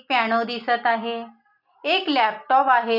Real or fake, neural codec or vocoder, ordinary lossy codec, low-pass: real; none; none; 5.4 kHz